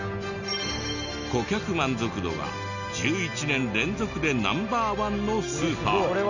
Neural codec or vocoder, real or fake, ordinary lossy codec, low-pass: none; real; none; 7.2 kHz